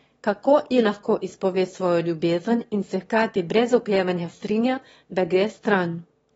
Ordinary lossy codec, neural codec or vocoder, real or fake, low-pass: AAC, 24 kbps; autoencoder, 22.05 kHz, a latent of 192 numbers a frame, VITS, trained on one speaker; fake; 9.9 kHz